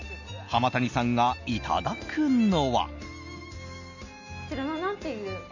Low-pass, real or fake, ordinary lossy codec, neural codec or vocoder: 7.2 kHz; real; none; none